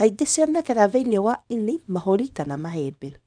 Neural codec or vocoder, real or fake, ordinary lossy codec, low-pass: codec, 24 kHz, 0.9 kbps, WavTokenizer, small release; fake; none; 9.9 kHz